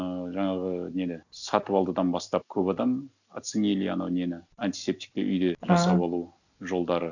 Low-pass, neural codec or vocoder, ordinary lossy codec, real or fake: 7.2 kHz; none; none; real